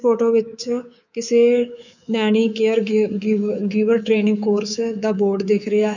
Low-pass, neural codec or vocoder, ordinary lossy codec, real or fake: 7.2 kHz; codec, 24 kHz, 3.1 kbps, DualCodec; none; fake